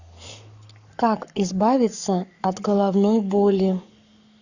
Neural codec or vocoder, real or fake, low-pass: codec, 44.1 kHz, 7.8 kbps, Pupu-Codec; fake; 7.2 kHz